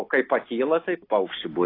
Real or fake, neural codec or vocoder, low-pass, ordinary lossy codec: real; none; 5.4 kHz; AAC, 24 kbps